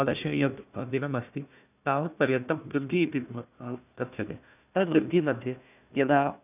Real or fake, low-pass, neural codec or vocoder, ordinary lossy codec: fake; 3.6 kHz; codec, 16 kHz, 1 kbps, FunCodec, trained on Chinese and English, 50 frames a second; none